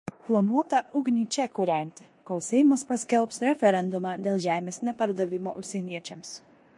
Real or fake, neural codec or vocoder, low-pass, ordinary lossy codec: fake; codec, 16 kHz in and 24 kHz out, 0.9 kbps, LongCat-Audio-Codec, four codebook decoder; 10.8 kHz; MP3, 48 kbps